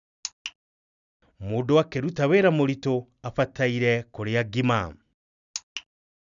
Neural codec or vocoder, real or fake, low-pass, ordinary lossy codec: none; real; 7.2 kHz; none